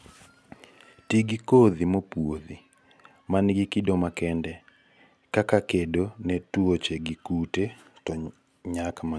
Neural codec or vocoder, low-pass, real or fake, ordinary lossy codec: none; none; real; none